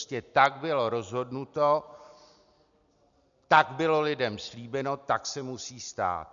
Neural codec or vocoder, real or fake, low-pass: none; real; 7.2 kHz